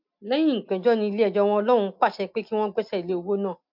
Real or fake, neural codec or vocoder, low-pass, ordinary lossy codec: real; none; 5.4 kHz; MP3, 48 kbps